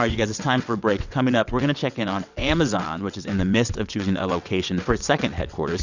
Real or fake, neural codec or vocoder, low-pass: fake; vocoder, 22.05 kHz, 80 mel bands, WaveNeXt; 7.2 kHz